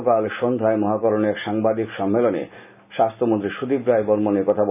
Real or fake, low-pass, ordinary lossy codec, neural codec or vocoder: real; 3.6 kHz; none; none